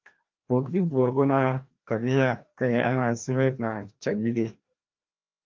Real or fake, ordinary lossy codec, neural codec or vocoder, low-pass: fake; Opus, 24 kbps; codec, 16 kHz, 1 kbps, FreqCodec, larger model; 7.2 kHz